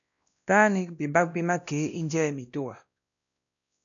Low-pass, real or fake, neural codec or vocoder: 7.2 kHz; fake; codec, 16 kHz, 1 kbps, X-Codec, WavLM features, trained on Multilingual LibriSpeech